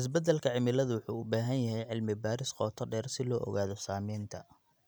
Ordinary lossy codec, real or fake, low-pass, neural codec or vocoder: none; real; none; none